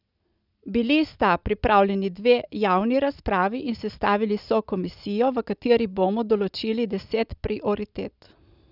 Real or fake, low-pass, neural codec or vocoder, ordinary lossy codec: real; 5.4 kHz; none; none